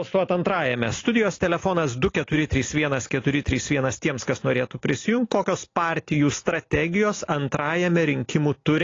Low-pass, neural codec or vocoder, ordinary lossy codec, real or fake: 7.2 kHz; none; AAC, 32 kbps; real